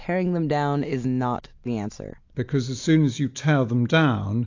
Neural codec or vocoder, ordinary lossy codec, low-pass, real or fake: none; AAC, 48 kbps; 7.2 kHz; real